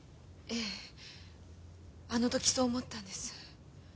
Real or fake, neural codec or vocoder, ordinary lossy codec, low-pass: real; none; none; none